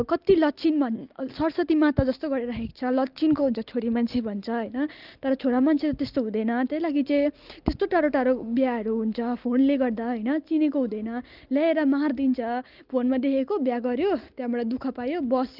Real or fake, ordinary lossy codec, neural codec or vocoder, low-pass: real; Opus, 32 kbps; none; 5.4 kHz